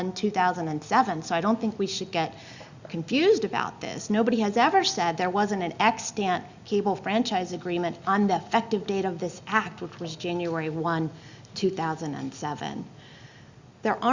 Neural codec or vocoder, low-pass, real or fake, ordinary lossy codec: none; 7.2 kHz; real; Opus, 64 kbps